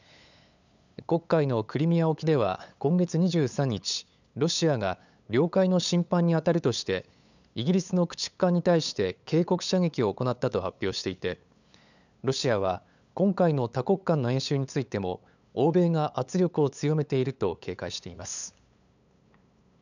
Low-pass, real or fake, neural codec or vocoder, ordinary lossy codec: 7.2 kHz; fake; codec, 16 kHz, 8 kbps, FunCodec, trained on LibriTTS, 25 frames a second; none